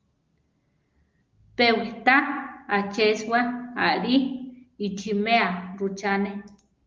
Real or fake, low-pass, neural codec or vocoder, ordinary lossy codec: real; 7.2 kHz; none; Opus, 24 kbps